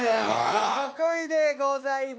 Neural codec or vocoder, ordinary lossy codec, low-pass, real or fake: codec, 16 kHz, 2 kbps, X-Codec, WavLM features, trained on Multilingual LibriSpeech; none; none; fake